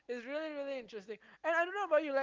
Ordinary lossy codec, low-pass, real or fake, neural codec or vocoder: Opus, 16 kbps; 7.2 kHz; real; none